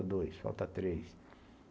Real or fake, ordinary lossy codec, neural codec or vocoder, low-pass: real; none; none; none